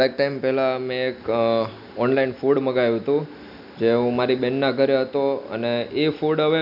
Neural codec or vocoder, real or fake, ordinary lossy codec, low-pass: none; real; none; 5.4 kHz